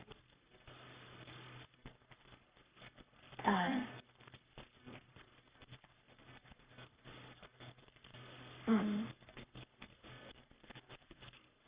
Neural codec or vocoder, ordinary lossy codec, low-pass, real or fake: codec, 44.1 kHz, 3.4 kbps, Pupu-Codec; Opus, 64 kbps; 3.6 kHz; fake